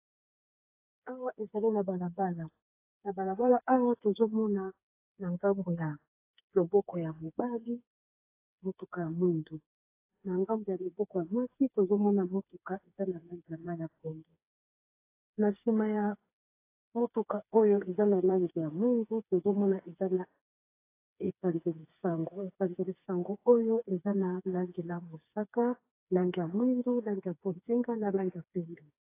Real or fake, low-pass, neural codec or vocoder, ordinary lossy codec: fake; 3.6 kHz; codec, 16 kHz, 4 kbps, FreqCodec, smaller model; AAC, 24 kbps